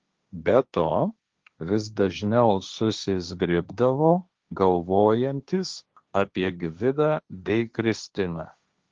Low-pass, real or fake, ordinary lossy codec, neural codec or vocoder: 7.2 kHz; fake; Opus, 32 kbps; codec, 16 kHz, 1.1 kbps, Voila-Tokenizer